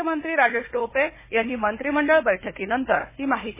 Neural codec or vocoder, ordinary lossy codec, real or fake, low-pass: codec, 16 kHz, 4 kbps, FunCodec, trained on Chinese and English, 50 frames a second; MP3, 16 kbps; fake; 3.6 kHz